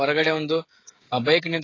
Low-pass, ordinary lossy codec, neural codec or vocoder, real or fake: 7.2 kHz; AAC, 32 kbps; codec, 16 kHz, 16 kbps, FreqCodec, smaller model; fake